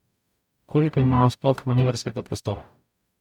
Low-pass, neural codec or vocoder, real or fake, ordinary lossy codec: 19.8 kHz; codec, 44.1 kHz, 0.9 kbps, DAC; fake; none